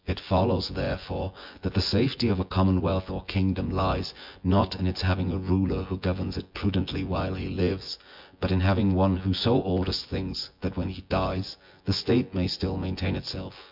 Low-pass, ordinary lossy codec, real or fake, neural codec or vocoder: 5.4 kHz; MP3, 48 kbps; fake; vocoder, 24 kHz, 100 mel bands, Vocos